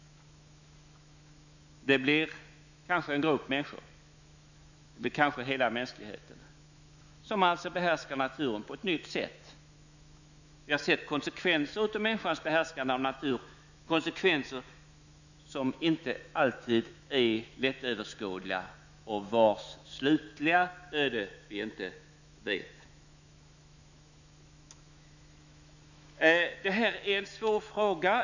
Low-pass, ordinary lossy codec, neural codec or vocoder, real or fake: 7.2 kHz; none; autoencoder, 48 kHz, 128 numbers a frame, DAC-VAE, trained on Japanese speech; fake